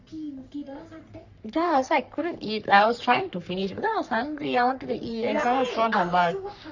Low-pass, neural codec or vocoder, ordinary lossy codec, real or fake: 7.2 kHz; codec, 44.1 kHz, 3.4 kbps, Pupu-Codec; none; fake